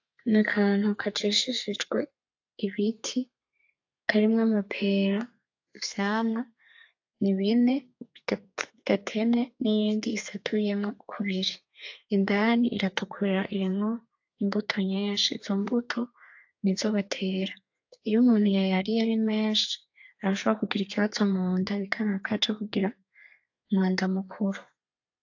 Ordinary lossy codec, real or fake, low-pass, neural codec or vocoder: AAC, 48 kbps; fake; 7.2 kHz; codec, 32 kHz, 1.9 kbps, SNAC